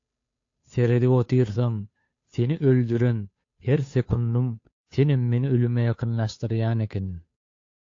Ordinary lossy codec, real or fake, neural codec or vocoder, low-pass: AAC, 48 kbps; fake; codec, 16 kHz, 2 kbps, FunCodec, trained on Chinese and English, 25 frames a second; 7.2 kHz